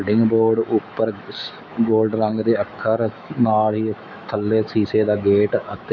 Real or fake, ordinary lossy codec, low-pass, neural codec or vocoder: fake; none; 7.2 kHz; autoencoder, 48 kHz, 128 numbers a frame, DAC-VAE, trained on Japanese speech